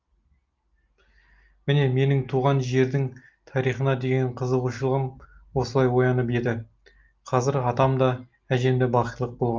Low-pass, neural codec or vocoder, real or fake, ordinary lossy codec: 7.2 kHz; none; real; Opus, 32 kbps